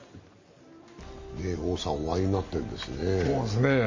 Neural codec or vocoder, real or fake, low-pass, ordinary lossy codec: none; real; 7.2 kHz; MP3, 32 kbps